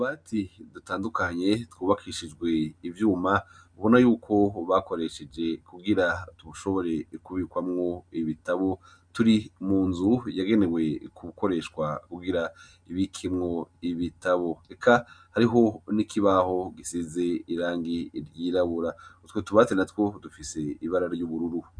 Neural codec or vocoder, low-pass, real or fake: none; 9.9 kHz; real